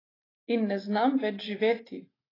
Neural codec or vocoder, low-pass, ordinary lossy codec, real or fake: none; 5.4 kHz; AAC, 24 kbps; real